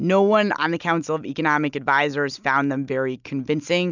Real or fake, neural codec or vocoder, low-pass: real; none; 7.2 kHz